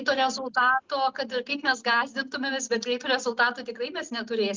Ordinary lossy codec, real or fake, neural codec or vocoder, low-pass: Opus, 32 kbps; real; none; 7.2 kHz